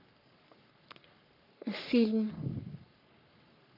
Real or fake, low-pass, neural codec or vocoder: fake; 5.4 kHz; codec, 44.1 kHz, 3.4 kbps, Pupu-Codec